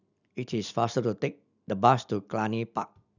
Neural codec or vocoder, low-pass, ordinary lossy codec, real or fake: none; 7.2 kHz; none; real